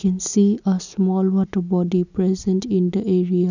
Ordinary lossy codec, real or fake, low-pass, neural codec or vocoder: none; real; 7.2 kHz; none